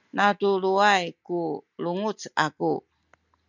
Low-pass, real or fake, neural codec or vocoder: 7.2 kHz; real; none